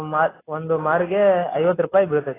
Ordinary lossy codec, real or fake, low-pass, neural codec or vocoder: AAC, 16 kbps; real; 3.6 kHz; none